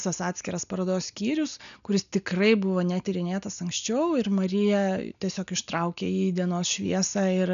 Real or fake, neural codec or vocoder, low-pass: real; none; 7.2 kHz